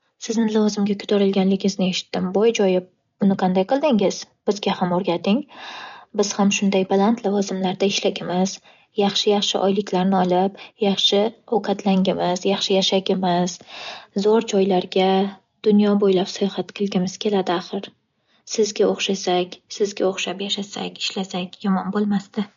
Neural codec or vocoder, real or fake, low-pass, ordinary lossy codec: none; real; 7.2 kHz; MP3, 48 kbps